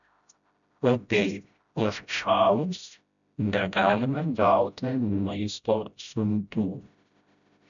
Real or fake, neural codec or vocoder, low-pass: fake; codec, 16 kHz, 0.5 kbps, FreqCodec, smaller model; 7.2 kHz